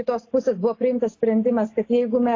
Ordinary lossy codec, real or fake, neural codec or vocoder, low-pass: AAC, 32 kbps; real; none; 7.2 kHz